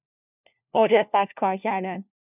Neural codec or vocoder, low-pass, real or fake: codec, 16 kHz, 1 kbps, FunCodec, trained on LibriTTS, 50 frames a second; 3.6 kHz; fake